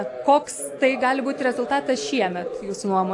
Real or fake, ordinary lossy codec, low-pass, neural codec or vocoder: real; AAC, 64 kbps; 10.8 kHz; none